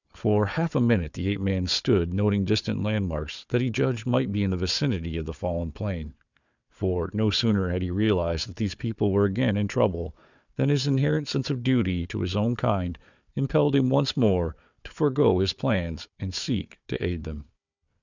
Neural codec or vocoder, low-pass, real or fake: codec, 16 kHz, 4 kbps, FunCodec, trained on Chinese and English, 50 frames a second; 7.2 kHz; fake